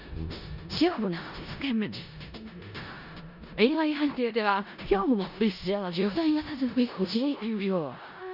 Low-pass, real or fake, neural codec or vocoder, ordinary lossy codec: 5.4 kHz; fake; codec, 16 kHz in and 24 kHz out, 0.4 kbps, LongCat-Audio-Codec, four codebook decoder; none